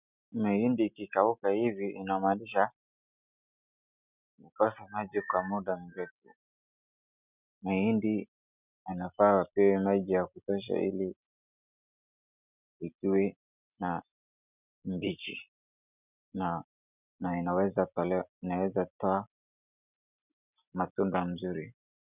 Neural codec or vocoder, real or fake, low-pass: none; real; 3.6 kHz